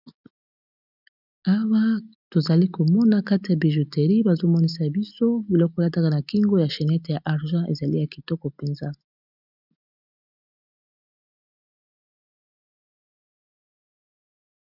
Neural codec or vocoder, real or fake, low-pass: none; real; 5.4 kHz